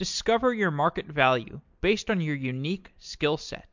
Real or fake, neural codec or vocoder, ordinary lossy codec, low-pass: real; none; MP3, 64 kbps; 7.2 kHz